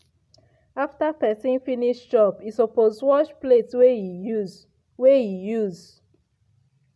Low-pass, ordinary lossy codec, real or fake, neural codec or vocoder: none; none; real; none